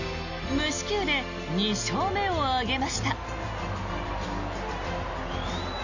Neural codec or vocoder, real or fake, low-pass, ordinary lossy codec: none; real; 7.2 kHz; none